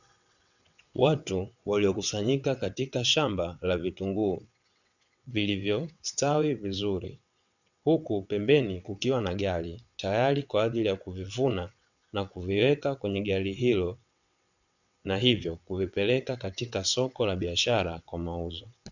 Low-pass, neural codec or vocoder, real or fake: 7.2 kHz; vocoder, 22.05 kHz, 80 mel bands, WaveNeXt; fake